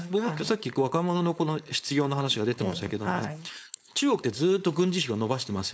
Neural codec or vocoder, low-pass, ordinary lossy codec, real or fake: codec, 16 kHz, 4.8 kbps, FACodec; none; none; fake